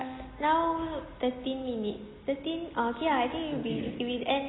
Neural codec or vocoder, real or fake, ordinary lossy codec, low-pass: none; real; AAC, 16 kbps; 7.2 kHz